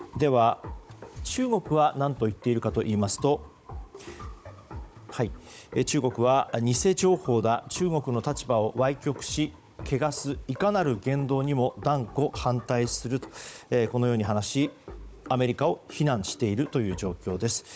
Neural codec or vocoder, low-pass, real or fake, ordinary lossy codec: codec, 16 kHz, 16 kbps, FunCodec, trained on Chinese and English, 50 frames a second; none; fake; none